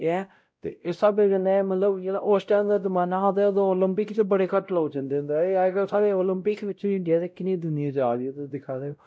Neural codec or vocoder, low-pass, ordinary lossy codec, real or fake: codec, 16 kHz, 0.5 kbps, X-Codec, WavLM features, trained on Multilingual LibriSpeech; none; none; fake